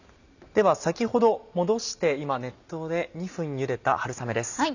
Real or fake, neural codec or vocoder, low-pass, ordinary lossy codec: real; none; 7.2 kHz; none